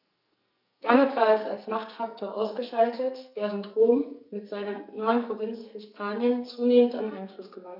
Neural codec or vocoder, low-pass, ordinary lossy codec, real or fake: codec, 44.1 kHz, 2.6 kbps, SNAC; 5.4 kHz; none; fake